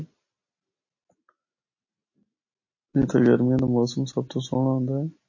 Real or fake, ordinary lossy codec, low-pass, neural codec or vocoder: real; MP3, 32 kbps; 7.2 kHz; none